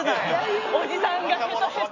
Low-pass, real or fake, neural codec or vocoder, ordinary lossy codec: 7.2 kHz; real; none; none